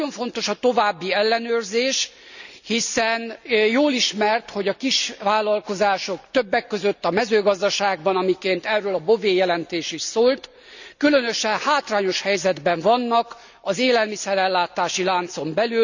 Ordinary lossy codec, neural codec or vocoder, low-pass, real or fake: none; none; 7.2 kHz; real